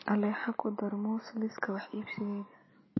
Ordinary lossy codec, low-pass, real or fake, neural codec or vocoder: MP3, 24 kbps; 7.2 kHz; real; none